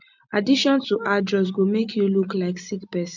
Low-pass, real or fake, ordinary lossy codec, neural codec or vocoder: 7.2 kHz; real; MP3, 64 kbps; none